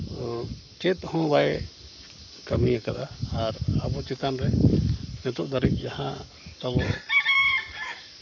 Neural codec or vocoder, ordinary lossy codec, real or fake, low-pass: codec, 44.1 kHz, 7.8 kbps, Pupu-Codec; none; fake; 7.2 kHz